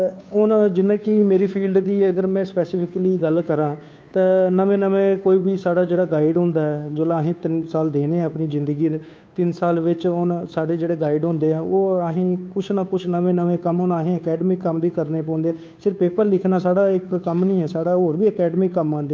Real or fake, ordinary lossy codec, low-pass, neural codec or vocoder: fake; none; none; codec, 16 kHz, 2 kbps, FunCodec, trained on Chinese and English, 25 frames a second